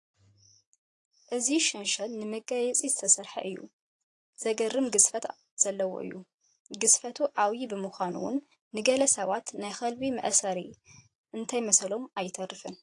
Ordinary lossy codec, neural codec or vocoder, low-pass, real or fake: AAC, 48 kbps; vocoder, 44.1 kHz, 128 mel bands every 512 samples, BigVGAN v2; 10.8 kHz; fake